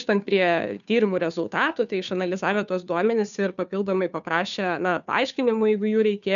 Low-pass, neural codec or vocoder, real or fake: 7.2 kHz; codec, 16 kHz, 2 kbps, FunCodec, trained on Chinese and English, 25 frames a second; fake